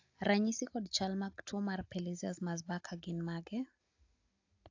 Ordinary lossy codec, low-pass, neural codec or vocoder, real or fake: AAC, 48 kbps; 7.2 kHz; none; real